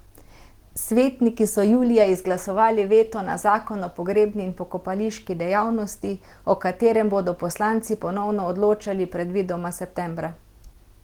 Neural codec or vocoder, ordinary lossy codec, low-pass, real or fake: none; Opus, 16 kbps; 19.8 kHz; real